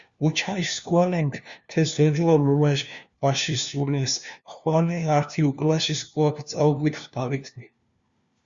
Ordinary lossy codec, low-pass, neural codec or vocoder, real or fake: Opus, 64 kbps; 7.2 kHz; codec, 16 kHz, 1 kbps, FunCodec, trained on LibriTTS, 50 frames a second; fake